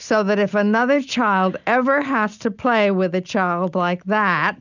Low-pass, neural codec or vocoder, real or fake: 7.2 kHz; none; real